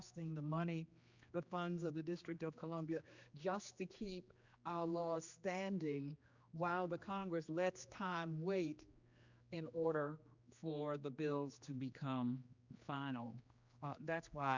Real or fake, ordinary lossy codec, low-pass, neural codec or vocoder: fake; Opus, 64 kbps; 7.2 kHz; codec, 16 kHz, 2 kbps, X-Codec, HuBERT features, trained on general audio